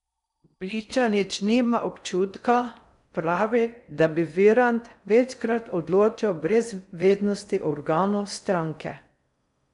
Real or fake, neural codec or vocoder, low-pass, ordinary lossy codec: fake; codec, 16 kHz in and 24 kHz out, 0.6 kbps, FocalCodec, streaming, 4096 codes; 10.8 kHz; none